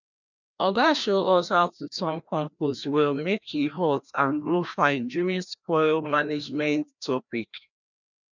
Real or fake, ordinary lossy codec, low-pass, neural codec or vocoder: fake; none; 7.2 kHz; codec, 16 kHz, 1 kbps, FreqCodec, larger model